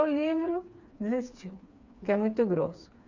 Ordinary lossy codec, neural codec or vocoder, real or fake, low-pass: Opus, 64 kbps; codec, 16 kHz, 4 kbps, FreqCodec, smaller model; fake; 7.2 kHz